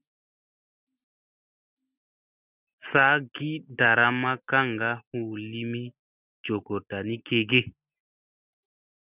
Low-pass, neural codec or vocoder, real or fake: 3.6 kHz; none; real